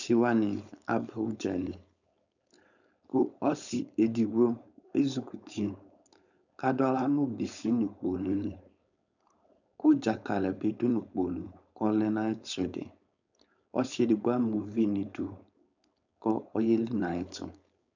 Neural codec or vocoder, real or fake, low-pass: codec, 16 kHz, 4.8 kbps, FACodec; fake; 7.2 kHz